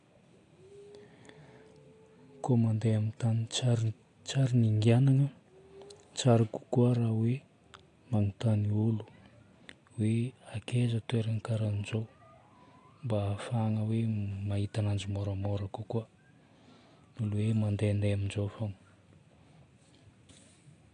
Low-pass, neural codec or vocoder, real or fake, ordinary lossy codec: 9.9 kHz; none; real; AAC, 48 kbps